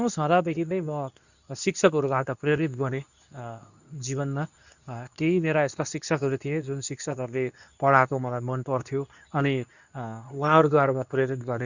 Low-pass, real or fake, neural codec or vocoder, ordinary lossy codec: 7.2 kHz; fake; codec, 24 kHz, 0.9 kbps, WavTokenizer, medium speech release version 2; none